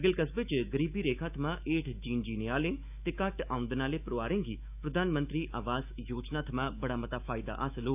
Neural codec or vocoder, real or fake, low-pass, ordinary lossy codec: autoencoder, 48 kHz, 128 numbers a frame, DAC-VAE, trained on Japanese speech; fake; 3.6 kHz; none